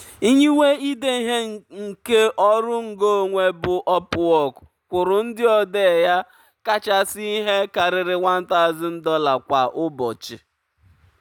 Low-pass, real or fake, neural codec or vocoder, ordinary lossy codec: 19.8 kHz; real; none; none